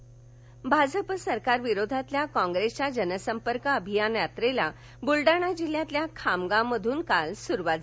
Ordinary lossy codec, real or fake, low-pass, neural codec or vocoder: none; real; none; none